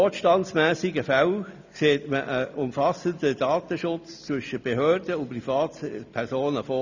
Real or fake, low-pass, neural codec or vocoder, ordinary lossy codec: real; 7.2 kHz; none; none